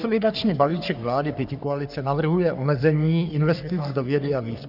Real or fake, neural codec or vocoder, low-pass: fake; codec, 44.1 kHz, 3.4 kbps, Pupu-Codec; 5.4 kHz